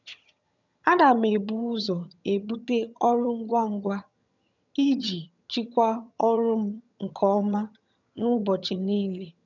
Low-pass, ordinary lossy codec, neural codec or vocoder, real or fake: 7.2 kHz; none; vocoder, 22.05 kHz, 80 mel bands, HiFi-GAN; fake